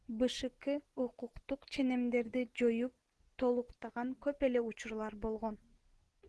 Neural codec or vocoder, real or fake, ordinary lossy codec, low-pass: none; real; Opus, 16 kbps; 10.8 kHz